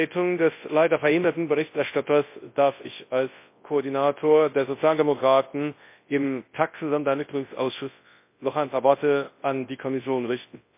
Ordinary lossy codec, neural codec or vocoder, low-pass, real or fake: MP3, 24 kbps; codec, 24 kHz, 0.9 kbps, WavTokenizer, large speech release; 3.6 kHz; fake